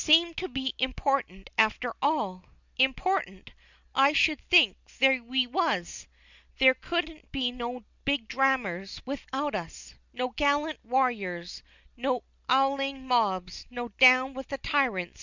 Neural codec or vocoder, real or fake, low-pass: none; real; 7.2 kHz